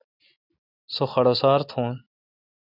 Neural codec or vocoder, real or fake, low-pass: none; real; 5.4 kHz